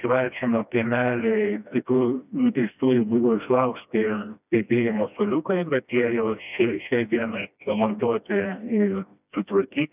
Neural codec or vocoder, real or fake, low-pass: codec, 16 kHz, 1 kbps, FreqCodec, smaller model; fake; 3.6 kHz